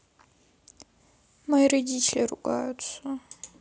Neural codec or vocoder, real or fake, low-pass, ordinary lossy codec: none; real; none; none